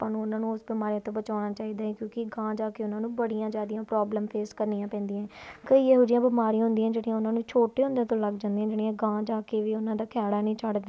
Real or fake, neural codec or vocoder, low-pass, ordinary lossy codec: real; none; none; none